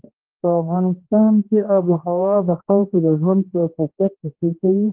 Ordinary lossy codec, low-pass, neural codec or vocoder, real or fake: Opus, 16 kbps; 3.6 kHz; codec, 16 kHz, 1 kbps, X-Codec, HuBERT features, trained on general audio; fake